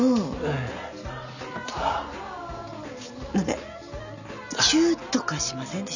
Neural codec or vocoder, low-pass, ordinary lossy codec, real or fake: none; 7.2 kHz; none; real